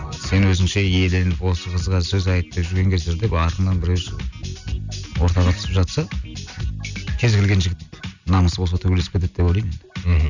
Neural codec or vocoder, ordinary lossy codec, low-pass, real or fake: none; none; 7.2 kHz; real